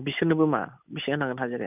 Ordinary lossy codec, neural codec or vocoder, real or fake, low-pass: none; none; real; 3.6 kHz